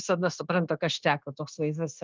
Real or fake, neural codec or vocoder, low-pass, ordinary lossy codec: fake; codec, 16 kHz, 0.9 kbps, LongCat-Audio-Codec; 7.2 kHz; Opus, 24 kbps